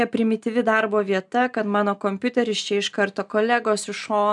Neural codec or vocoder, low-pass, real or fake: none; 10.8 kHz; real